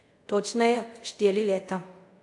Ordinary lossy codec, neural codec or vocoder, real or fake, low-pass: AAC, 48 kbps; codec, 24 kHz, 0.5 kbps, DualCodec; fake; 10.8 kHz